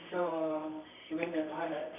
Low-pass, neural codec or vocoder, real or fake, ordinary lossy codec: 3.6 kHz; codec, 24 kHz, 0.9 kbps, WavTokenizer, medium music audio release; fake; none